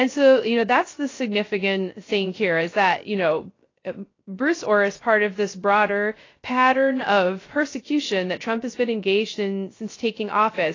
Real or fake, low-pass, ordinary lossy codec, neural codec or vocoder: fake; 7.2 kHz; AAC, 32 kbps; codec, 16 kHz, 0.3 kbps, FocalCodec